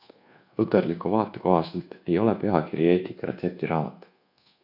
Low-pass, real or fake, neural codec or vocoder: 5.4 kHz; fake; codec, 24 kHz, 1.2 kbps, DualCodec